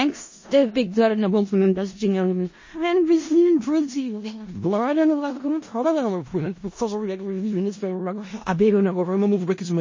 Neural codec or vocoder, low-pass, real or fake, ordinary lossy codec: codec, 16 kHz in and 24 kHz out, 0.4 kbps, LongCat-Audio-Codec, four codebook decoder; 7.2 kHz; fake; MP3, 32 kbps